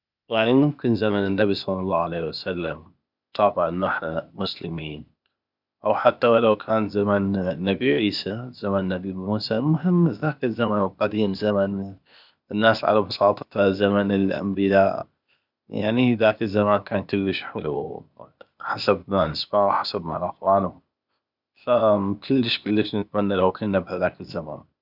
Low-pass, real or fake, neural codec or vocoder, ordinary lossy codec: 5.4 kHz; fake; codec, 16 kHz, 0.8 kbps, ZipCodec; none